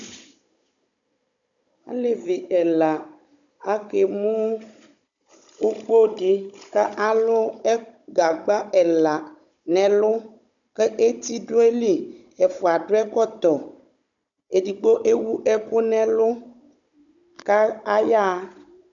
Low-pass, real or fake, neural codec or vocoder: 7.2 kHz; fake; codec, 16 kHz, 16 kbps, FunCodec, trained on Chinese and English, 50 frames a second